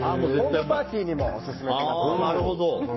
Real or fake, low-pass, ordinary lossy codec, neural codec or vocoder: fake; 7.2 kHz; MP3, 24 kbps; codec, 44.1 kHz, 7.8 kbps, Pupu-Codec